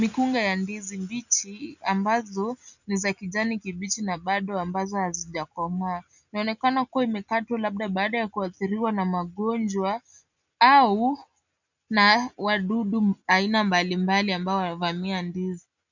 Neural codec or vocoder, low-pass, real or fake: none; 7.2 kHz; real